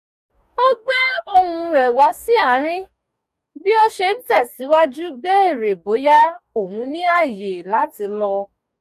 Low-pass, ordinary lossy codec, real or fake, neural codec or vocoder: 14.4 kHz; none; fake; codec, 44.1 kHz, 2.6 kbps, DAC